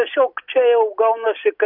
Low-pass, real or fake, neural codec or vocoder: 5.4 kHz; real; none